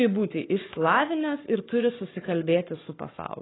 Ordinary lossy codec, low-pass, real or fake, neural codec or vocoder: AAC, 16 kbps; 7.2 kHz; fake; codec, 44.1 kHz, 7.8 kbps, Pupu-Codec